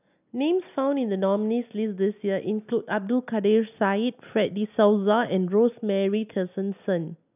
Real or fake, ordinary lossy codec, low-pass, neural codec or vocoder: real; none; 3.6 kHz; none